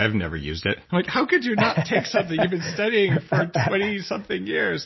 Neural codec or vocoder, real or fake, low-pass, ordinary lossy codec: none; real; 7.2 kHz; MP3, 24 kbps